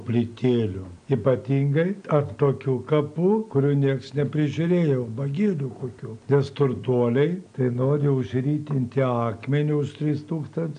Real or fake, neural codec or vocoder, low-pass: real; none; 9.9 kHz